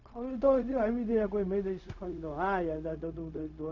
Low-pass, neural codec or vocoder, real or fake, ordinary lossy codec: 7.2 kHz; codec, 16 kHz, 0.4 kbps, LongCat-Audio-Codec; fake; none